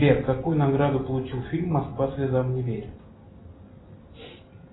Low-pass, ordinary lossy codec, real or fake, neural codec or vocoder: 7.2 kHz; AAC, 16 kbps; real; none